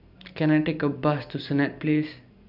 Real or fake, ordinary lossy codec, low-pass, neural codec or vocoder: real; none; 5.4 kHz; none